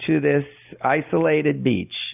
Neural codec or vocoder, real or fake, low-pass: none; real; 3.6 kHz